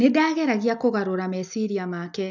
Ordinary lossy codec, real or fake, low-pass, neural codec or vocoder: none; real; 7.2 kHz; none